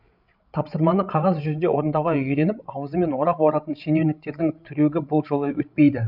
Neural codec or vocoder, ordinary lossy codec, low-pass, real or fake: codec, 16 kHz, 16 kbps, FreqCodec, larger model; none; 5.4 kHz; fake